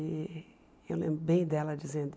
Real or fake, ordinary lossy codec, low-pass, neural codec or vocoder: real; none; none; none